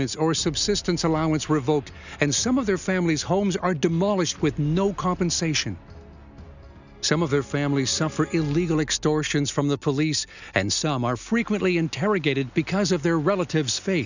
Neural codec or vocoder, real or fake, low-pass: none; real; 7.2 kHz